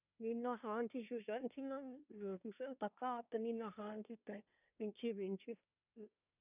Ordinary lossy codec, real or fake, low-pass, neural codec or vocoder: none; fake; 3.6 kHz; codec, 24 kHz, 1 kbps, SNAC